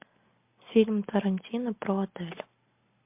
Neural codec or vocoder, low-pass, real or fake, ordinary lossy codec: none; 3.6 kHz; real; MP3, 32 kbps